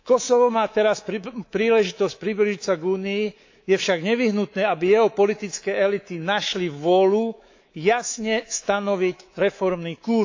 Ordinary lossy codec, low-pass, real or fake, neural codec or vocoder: AAC, 48 kbps; 7.2 kHz; fake; codec, 24 kHz, 3.1 kbps, DualCodec